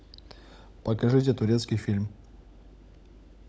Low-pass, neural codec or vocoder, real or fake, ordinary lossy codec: none; codec, 16 kHz, 16 kbps, FunCodec, trained on LibriTTS, 50 frames a second; fake; none